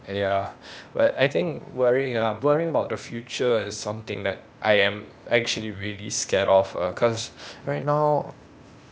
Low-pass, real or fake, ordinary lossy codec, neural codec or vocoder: none; fake; none; codec, 16 kHz, 0.8 kbps, ZipCodec